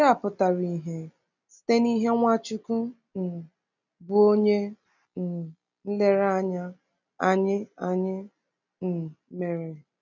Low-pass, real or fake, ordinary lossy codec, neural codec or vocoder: 7.2 kHz; real; none; none